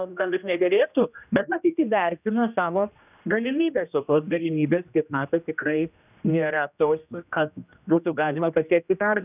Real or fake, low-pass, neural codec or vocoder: fake; 3.6 kHz; codec, 16 kHz, 1 kbps, X-Codec, HuBERT features, trained on general audio